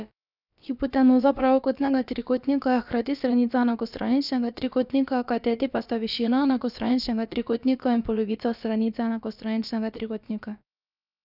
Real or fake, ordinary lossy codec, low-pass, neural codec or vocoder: fake; none; 5.4 kHz; codec, 16 kHz, about 1 kbps, DyCAST, with the encoder's durations